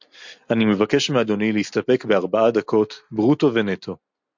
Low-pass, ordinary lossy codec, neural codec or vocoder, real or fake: 7.2 kHz; MP3, 64 kbps; none; real